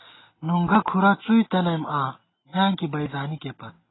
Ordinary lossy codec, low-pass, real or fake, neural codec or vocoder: AAC, 16 kbps; 7.2 kHz; real; none